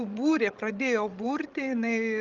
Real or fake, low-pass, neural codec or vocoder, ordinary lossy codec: fake; 7.2 kHz; codec, 16 kHz, 16 kbps, FreqCodec, larger model; Opus, 32 kbps